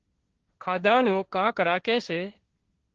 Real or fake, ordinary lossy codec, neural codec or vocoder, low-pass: fake; Opus, 16 kbps; codec, 16 kHz, 1.1 kbps, Voila-Tokenizer; 7.2 kHz